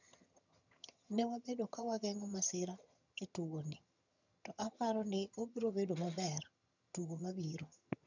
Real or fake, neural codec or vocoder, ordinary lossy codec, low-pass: fake; vocoder, 22.05 kHz, 80 mel bands, HiFi-GAN; none; 7.2 kHz